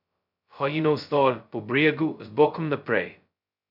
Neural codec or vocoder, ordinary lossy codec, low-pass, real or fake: codec, 16 kHz, 0.2 kbps, FocalCodec; none; 5.4 kHz; fake